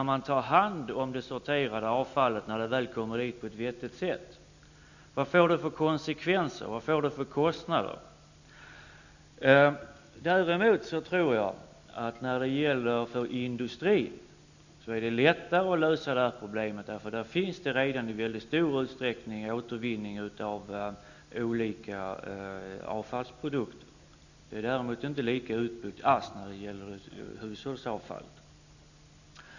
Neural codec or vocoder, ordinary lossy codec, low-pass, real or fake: none; none; 7.2 kHz; real